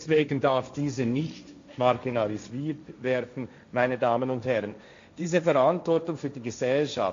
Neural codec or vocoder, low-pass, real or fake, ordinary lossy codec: codec, 16 kHz, 1.1 kbps, Voila-Tokenizer; 7.2 kHz; fake; AAC, 48 kbps